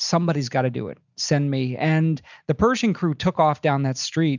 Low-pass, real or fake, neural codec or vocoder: 7.2 kHz; real; none